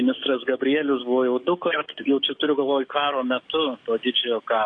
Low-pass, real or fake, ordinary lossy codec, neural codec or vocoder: 9.9 kHz; fake; AAC, 48 kbps; codec, 44.1 kHz, 7.8 kbps, DAC